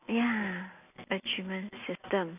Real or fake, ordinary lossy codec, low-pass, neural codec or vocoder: real; AAC, 32 kbps; 3.6 kHz; none